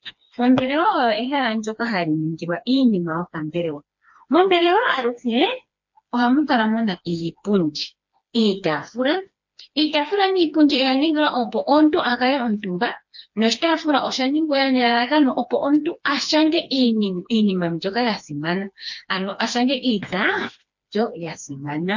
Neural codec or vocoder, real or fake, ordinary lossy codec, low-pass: codec, 16 kHz, 2 kbps, FreqCodec, smaller model; fake; MP3, 48 kbps; 7.2 kHz